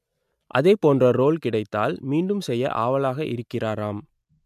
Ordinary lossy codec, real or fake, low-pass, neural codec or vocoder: MP3, 96 kbps; real; 14.4 kHz; none